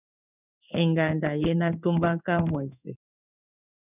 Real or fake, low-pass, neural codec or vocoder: fake; 3.6 kHz; codec, 16 kHz in and 24 kHz out, 1 kbps, XY-Tokenizer